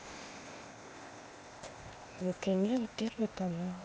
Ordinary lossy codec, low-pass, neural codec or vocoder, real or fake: none; none; codec, 16 kHz, 0.8 kbps, ZipCodec; fake